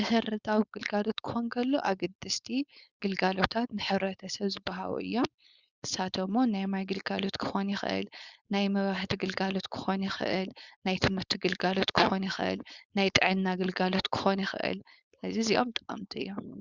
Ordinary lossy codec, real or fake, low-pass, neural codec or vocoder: Opus, 64 kbps; fake; 7.2 kHz; codec, 16 kHz, 4.8 kbps, FACodec